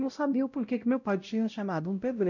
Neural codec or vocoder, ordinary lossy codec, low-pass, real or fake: codec, 16 kHz, 0.5 kbps, X-Codec, WavLM features, trained on Multilingual LibriSpeech; none; 7.2 kHz; fake